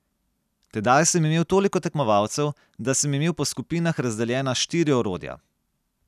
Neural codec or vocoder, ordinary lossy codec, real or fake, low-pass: none; none; real; 14.4 kHz